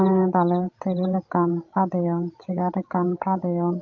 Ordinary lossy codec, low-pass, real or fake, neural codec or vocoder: Opus, 32 kbps; 7.2 kHz; fake; codec, 16 kHz, 16 kbps, FreqCodec, larger model